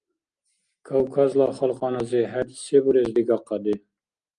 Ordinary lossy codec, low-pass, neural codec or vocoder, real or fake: Opus, 32 kbps; 9.9 kHz; none; real